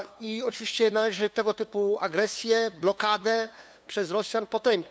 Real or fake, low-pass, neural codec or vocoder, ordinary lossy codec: fake; none; codec, 16 kHz, 2 kbps, FunCodec, trained on LibriTTS, 25 frames a second; none